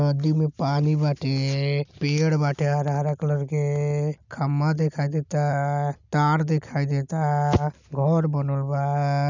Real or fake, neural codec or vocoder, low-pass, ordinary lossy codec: real; none; 7.2 kHz; none